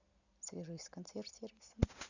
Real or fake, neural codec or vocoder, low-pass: real; none; 7.2 kHz